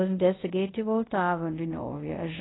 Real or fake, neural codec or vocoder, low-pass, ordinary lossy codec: fake; codec, 24 kHz, 0.5 kbps, DualCodec; 7.2 kHz; AAC, 16 kbps